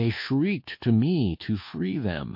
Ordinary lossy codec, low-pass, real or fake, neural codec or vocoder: MP3, 32 kbps; 5.4 kHz; fake; codec, 24 kHz, 1.2 kbps, DualCodec